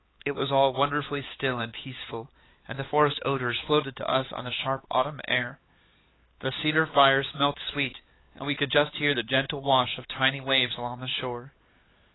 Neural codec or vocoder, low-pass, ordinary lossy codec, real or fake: codec, 16 kHz, 4 kbps, X-Codec, HuBERT features, trained on LibriSpeech; 7.2 kHz; AAC, 16 kbps; fake